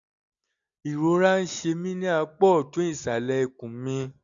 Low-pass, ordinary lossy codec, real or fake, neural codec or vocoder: 7.2 kHz; none; real; none